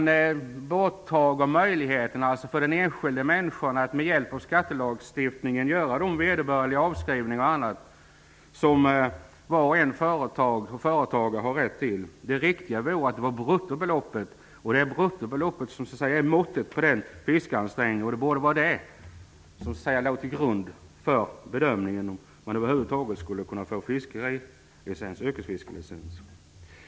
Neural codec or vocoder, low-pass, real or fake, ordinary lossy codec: none; none; real; none